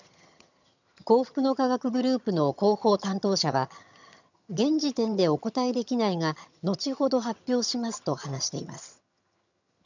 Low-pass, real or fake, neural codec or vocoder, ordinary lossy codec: 7.2 kHz; fake; vocoder, 22.05 kHz, 80 mel bands, HiFi-GAN; none